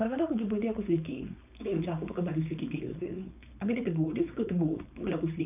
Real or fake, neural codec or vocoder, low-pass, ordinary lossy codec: fake; codec, 16 kHz, 4.8 kbps, FACodec; 3.6 kHz; none